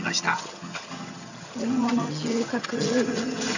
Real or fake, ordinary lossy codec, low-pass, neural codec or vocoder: fake; none; 7.2 kHz; vocoder, 22.05 kHz, 80 mel bands, HiFi-GAN